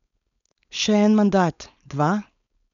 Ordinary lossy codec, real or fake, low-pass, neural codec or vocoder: none; fake; 7.2 kHz; codec, 16 kHz, 4.8 kbps, FACodec